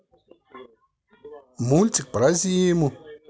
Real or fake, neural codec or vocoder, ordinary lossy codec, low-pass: real; none; none; none